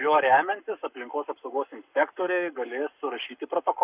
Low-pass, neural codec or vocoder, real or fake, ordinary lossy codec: 3.6 kHz; codec, 44.1 kHz, 7.8 kbps, Pupu-Codec; fake; Opus, 64 kbps